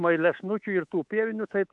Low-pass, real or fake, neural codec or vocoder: 10.8 kHz; fake; codec, 24 kHz, 3.1 kbps, DualCodec